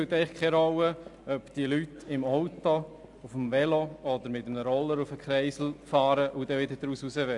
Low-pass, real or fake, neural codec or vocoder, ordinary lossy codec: 10.8 kHz; real; none; AAC, 64 kbps